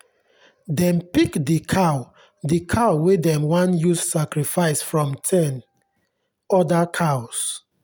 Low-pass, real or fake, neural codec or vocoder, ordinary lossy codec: none; real; none; none